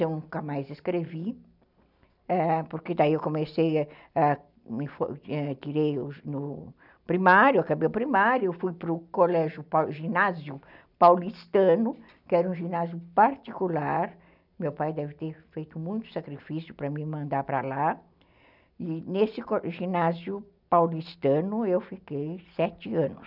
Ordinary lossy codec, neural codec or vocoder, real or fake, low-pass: none; none; real; 5.4 kHz